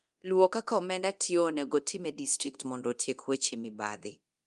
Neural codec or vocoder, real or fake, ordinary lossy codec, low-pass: codec, 24 kHz, 0.9 kbps, DualCodec; fake; Opus, 32 kbps; 10.8 kHz